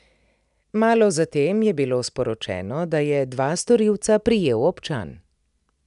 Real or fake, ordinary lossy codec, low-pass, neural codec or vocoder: real; none; 10.8 kHz; none